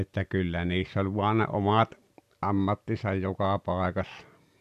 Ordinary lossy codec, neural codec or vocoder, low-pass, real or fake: none; none; 14.4 kHz; real